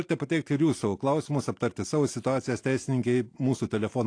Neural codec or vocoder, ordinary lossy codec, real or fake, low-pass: none; AAC, 48 kbps; real; 9.9 kHz